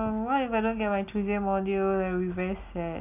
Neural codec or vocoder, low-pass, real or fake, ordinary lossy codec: none; 3.6 kHz; real; none